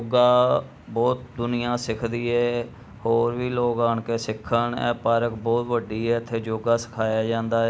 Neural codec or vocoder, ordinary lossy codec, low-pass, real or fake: none; none; none; real